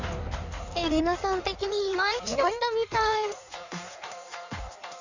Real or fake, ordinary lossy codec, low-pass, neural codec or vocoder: fake; none; 7.2 kHz; codec, 16 kHz in and 24 kHz out, 1.1 kbps, FireRedTTS-2 codec